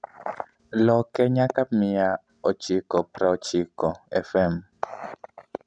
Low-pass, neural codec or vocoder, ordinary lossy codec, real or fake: 9.9 kHz; none; MP3, 96 kbps; real